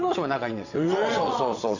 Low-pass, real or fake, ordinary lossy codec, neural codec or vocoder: 7.2 kHz; fake; none; vocoder, 22.05 kHz, 80 mel bands, WaveNeXt